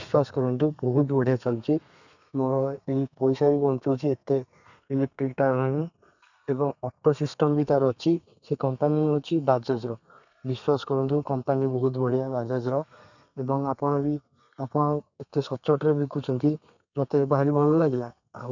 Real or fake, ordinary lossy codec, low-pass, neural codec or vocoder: fake; none; 7.2 kHz; codec, 32 kHz, 1.9 kbps, SNAC